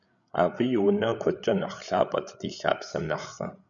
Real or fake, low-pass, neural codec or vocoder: fake; 7.2 kHz; codec, 16 kHz, 8 kbps, FreqCodec, larger model